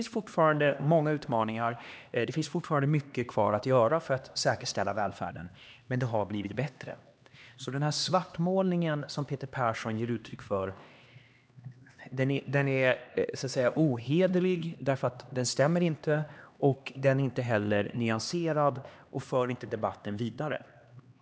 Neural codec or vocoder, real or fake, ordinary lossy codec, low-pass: codec, 16 kHz, 2 kbps, X-Codec, HuBERT features, trained on LibriSpeech; fake; none; none